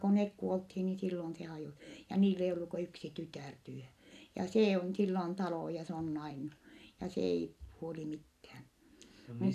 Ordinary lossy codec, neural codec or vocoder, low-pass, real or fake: none; none; 14.4 kHz; real